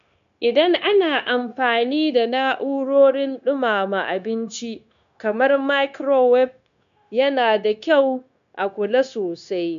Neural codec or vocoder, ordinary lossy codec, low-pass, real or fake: codec, 16 kHz, 0.9 kbps, LongCat-Audio-Codec; none; 7.2 kHz; fake